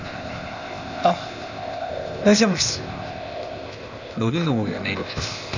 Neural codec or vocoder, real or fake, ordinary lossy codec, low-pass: codec, 16 kHz, 0.8 kbps, ZipCodec; fake; none; 7.2 kHz